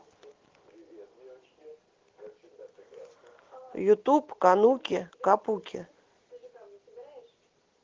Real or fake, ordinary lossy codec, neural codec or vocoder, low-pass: real; Opus, 16 kbps; none; 7.2 kHz